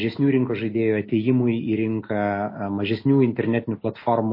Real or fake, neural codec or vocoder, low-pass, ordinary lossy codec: real; none; 5.4 kHz; MP3, 24 kbps